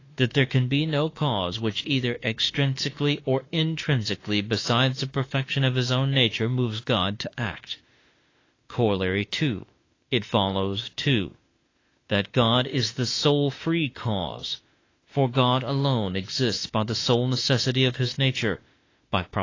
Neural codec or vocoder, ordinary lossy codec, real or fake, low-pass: autoencoder, 48 kHz, 32 numbers a frame, DAC-VAE, trained on Japanese speech; AAC, 32 kbps; fake; 7.2 kHz